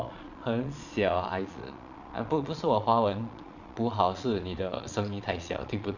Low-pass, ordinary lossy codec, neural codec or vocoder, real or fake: 7.2 kHz; none; vocoder, 22.05 kHz, 80 mel bands, Vocos; fake